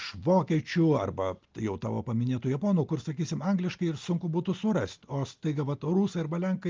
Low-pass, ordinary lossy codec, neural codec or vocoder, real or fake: 7.2 kHz; Opus, 32 kbps; none; real